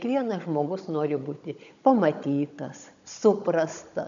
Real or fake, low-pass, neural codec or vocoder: fake; 7.2 kHz; codec, 16 kHz, 16 kbps, FunCodec, trained on Chinese and English, 50 frames a second